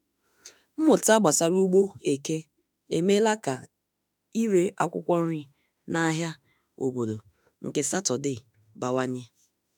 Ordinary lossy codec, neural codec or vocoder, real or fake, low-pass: none; autoencoder, 48 kHz, 32 numbers a frame, DAC-VAE, trained on Japanese speech; fake; none